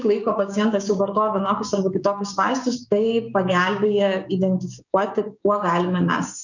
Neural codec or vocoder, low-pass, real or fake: codec, 16 kHz, 6 kbps, DAC; 7.2 kHz; fake